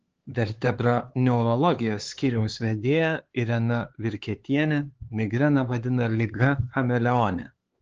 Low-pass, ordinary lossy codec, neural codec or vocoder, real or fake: 7.2 kHz; Opus, 16 kbps; codec, 16 kHz, 4 kbps, X-Codec, HuBERT features, trained on LibriSpeech; fake